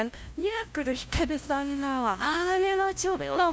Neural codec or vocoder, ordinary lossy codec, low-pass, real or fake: codec, 16 kHz, 0.5 kbps, FunCodec, trained on LibriTTS, 25 frames a second; none; none; fake